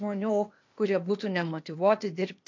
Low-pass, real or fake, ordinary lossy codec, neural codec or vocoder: 7.2 kHz; fake; MP3, 48 kbps; codec, 16 kHz, 0.8 kbps, ZipCodec